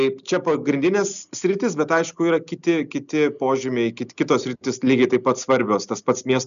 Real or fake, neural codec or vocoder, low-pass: real; none; 7.2 kHz